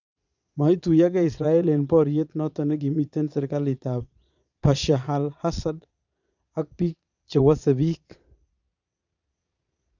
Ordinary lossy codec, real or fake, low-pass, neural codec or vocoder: none; fake; 7.2 kHz; vocoder, 22.05 kHz, 80 mel bands, Vocos